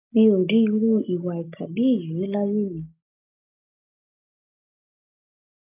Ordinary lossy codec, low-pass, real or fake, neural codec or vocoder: AAC, 32 kbps; 3.6 kHz; real; none